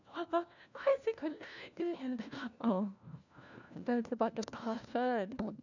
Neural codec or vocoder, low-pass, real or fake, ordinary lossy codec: codec, 16 kHz, 1 kbps, FunCodec, trained on LibriTTS, 50 frames a second; 7.2 kHz; fake; none